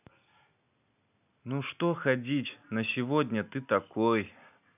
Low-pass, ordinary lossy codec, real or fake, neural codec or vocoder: 3.6 kHz; none; real; none